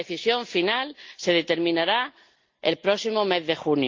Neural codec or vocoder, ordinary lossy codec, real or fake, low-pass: none; Opus, 24 kbps; real; 7.2 kHz